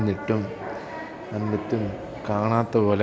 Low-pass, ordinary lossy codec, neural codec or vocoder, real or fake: none; none; none; real